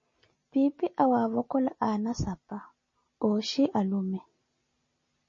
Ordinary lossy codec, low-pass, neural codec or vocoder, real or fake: MP3, 32 kbps; 7.2 kHz; none; real